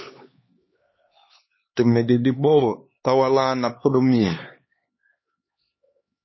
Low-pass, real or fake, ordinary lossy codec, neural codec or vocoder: 7.2 kHz; fake; MP3, 24 kbps; codec, 16 kHz, 2 kbps, X-Codec, HuBERT features, trained on LibriSpeech